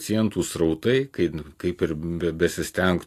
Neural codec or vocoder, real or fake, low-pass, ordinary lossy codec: none; real; 14.4 kHz; AAC, 64 kbps